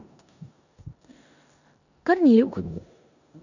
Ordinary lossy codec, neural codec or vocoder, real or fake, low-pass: none; codec, 16 kHz in and 24 kHz out, 0.9 kbps, LongCat-Audio-Codec, four codebook decoder; fake; 7.2 kHz